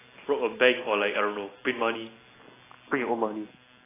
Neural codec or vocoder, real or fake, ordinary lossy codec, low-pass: none; real; AAC, 16 kbps; 3.6 kHz